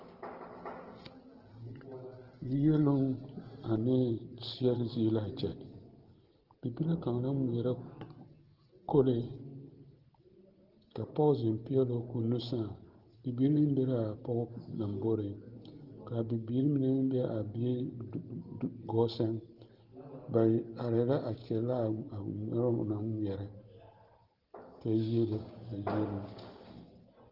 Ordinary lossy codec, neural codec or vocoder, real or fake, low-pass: Opus, 16 kbps; none; real; 5.4 kHz